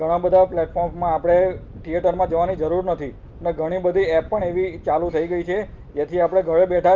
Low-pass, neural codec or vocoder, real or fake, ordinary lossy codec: 7.2 kHz; none; real; Opus, 32 kbps